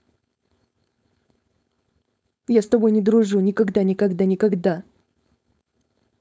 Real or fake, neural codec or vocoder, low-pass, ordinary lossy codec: fake; codec, 16 kHz, 4.8 kbps, FACodec; none; none